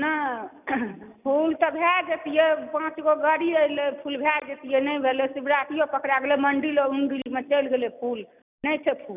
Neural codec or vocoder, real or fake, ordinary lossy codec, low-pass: none; real; none; 3.6 kHz